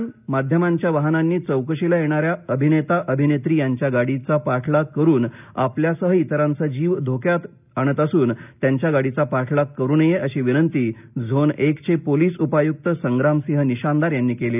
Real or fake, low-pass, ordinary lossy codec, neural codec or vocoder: real; 3.6 kHz; none; none